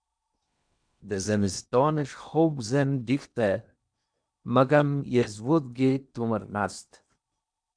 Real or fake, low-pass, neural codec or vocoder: fake; 9.9 kHz; codec, 16 kHz in and 24 kHz out, 0.8 kbps, FocalCodec, streaming, 65536 codes